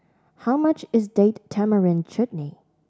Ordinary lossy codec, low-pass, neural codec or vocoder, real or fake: none; none; none; real